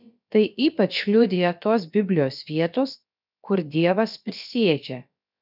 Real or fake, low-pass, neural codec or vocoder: fake; 5.4 kHz; codec, 16 kHz, about 1 kbps, DyCAST, with the encoder's durations